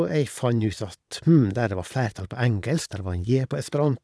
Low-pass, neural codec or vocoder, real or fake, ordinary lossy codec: none; vocoder, 22.05 kHz, 80 mel bands, Vocos; fake; none